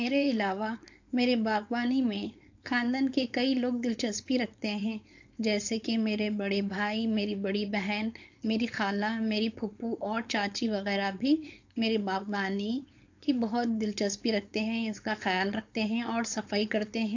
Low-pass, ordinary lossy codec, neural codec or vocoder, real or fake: 7.2 kHz; AAC, 48 kbps; codec, 16 kHz, 4.8 kbps, FACodec; fake